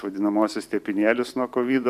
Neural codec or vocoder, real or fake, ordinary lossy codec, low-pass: vocoder, 44.1 kHz, 128 mel bands every 512 samples, BigVGAN v2; fake; AAC, 96 kbps; 14.4 kHz